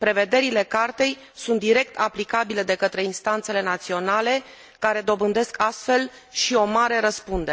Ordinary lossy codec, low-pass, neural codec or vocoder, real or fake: none; none; none; real